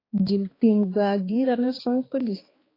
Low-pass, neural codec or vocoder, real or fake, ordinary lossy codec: 5.4 kHz; codec, 16 kHz, 2 kbps, X-Codec, HuBERT features, trained on balanced general audio; fake; AAC, 24 kbps